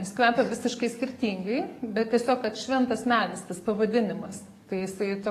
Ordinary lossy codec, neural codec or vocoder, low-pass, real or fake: AAC, 48 kbps; codec, 44.1 kHz, 7.8 kbps, DAC; 14.4 kHz; fake